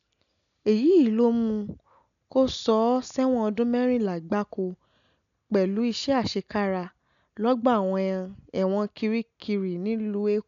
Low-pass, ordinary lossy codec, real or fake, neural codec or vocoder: 7.2 kHz; none; real; none